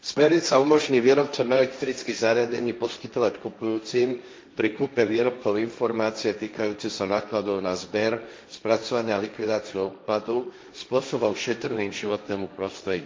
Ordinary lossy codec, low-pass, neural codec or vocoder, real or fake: none; none; codec, 16 kHz, 1.1 kbps, Voila-Tokenizer; fake